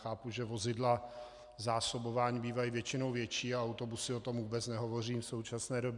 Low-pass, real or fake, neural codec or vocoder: 10.8 kHz; real; none